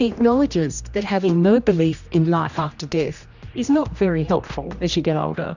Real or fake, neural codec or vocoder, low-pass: fake; codec, 16 kHz, 1 kbps, X-Codec, HuBERT features, trained on general audio; 7.2 kHz